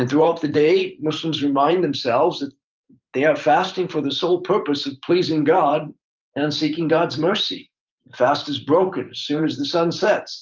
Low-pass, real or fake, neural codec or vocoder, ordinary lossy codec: 7.2 kHz; fake; vocoder, 44.1 kHz, 128 mel bands every 512 samples, BigVGAN v2; Opus, 24 kbps